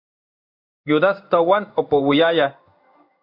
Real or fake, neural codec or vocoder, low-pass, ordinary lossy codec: fake; codec, 16 kHz in and 24 kHz out, 1 kbps, XY-Tokenizer; 5.4 kHz; AAC, 48 kbps